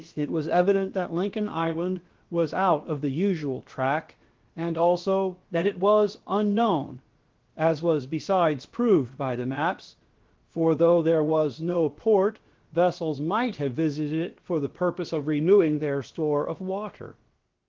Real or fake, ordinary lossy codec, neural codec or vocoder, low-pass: fake; Opus, 16 kbps; codec, 16 kHz, about 1 kbps, DyCAST, with the encoder's durations; 7.2 kHz